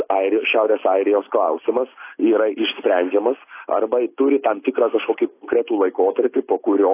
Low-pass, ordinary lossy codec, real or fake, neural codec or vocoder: 3.6 kHz; AAC, 24 kbps; real; none